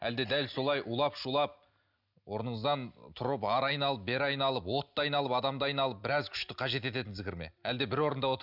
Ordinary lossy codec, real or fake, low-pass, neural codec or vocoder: none; real; 5.4 kHz; none